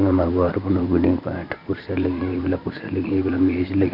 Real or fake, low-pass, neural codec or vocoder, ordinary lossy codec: fake; 5.4 kHz; vocoder, 44.1 kHz, 128 mel bands every 512 samples, BigVGAN v2; none